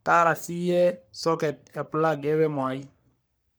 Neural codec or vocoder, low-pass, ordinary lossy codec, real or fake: codec, 44.1 kHz, 3.4 kbps, Pupu-Codec; none; none; fake